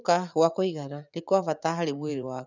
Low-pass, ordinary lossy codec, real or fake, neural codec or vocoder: 7.2 kHz; none; fake; vocoder, 44.1 kHz, 80 mel bands, Vocos